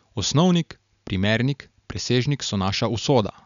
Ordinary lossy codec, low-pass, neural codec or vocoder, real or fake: none; 7.2 kHz; none; real